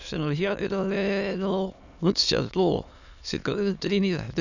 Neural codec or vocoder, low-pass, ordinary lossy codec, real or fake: autoencoder, 22.05 kHz, a latent of 192 numbers a frame, VITS, trained on many speakers; 7.2 kHz; none; fake